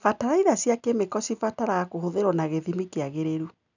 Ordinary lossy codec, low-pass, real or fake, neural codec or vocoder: none; 7.2 kHz; real; none